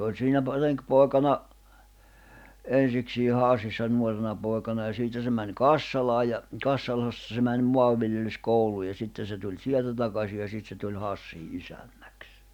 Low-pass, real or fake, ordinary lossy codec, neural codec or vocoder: 19.8 kHz; real; none; none